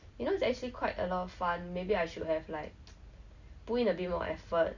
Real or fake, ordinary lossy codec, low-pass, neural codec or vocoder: real; Opus, 64 kbps; 7.2 kHz; none